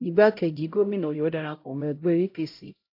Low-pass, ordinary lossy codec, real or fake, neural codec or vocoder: 5.4 kHz; AAC, 32 kbps; fake; codec, 16 kHz, 0.5 kbps, X-Codec, HuBERT features, trained on LibriSpeech